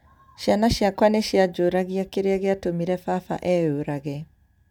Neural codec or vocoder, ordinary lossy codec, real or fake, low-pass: none; none; real; 19.8 kHz